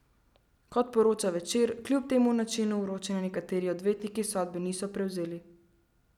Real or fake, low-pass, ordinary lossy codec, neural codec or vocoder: real; 19.8 kHz; none; none